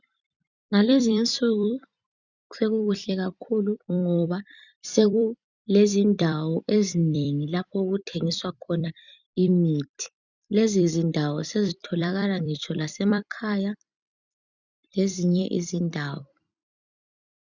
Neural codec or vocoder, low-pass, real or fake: vocoder, 44.1 kHz, 128 mel bands every 256 samples, BigVGAN v2; 7.2 kHz; fake